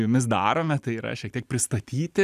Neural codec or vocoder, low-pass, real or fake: none; 14.4 kHz; real